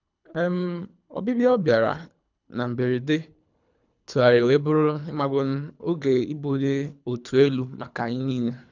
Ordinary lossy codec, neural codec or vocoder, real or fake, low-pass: none; codec, 24 kHz, 3 kbps, HILCodec; fake; 7.2 kHz